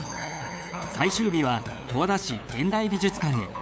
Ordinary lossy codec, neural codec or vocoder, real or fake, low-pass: none; codec, 16 kHz, 8 kbps, FunCodec, trained on LibriTTS, 25 frames a second; fake; none